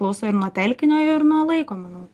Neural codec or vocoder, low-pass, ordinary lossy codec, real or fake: none; 14.4 kHz; Opus, 24 kbps; real